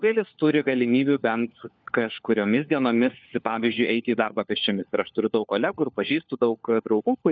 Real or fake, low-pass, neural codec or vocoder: fake; 7.2 kHz; codec, 16 kHz, 4 kbps, FunCodec, trained on LibriTTS, 50 frames a second